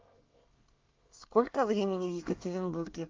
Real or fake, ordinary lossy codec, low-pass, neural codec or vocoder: fake; Opus, 32 kbps; 7.2 kHz; codec, 24 kHz, 1 kbps, SNAC